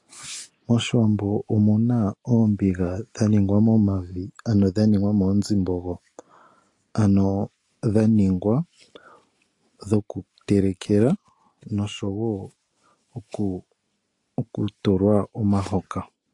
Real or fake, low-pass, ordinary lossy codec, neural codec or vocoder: real; 10.8 kHz; AAC, 48 kbps; none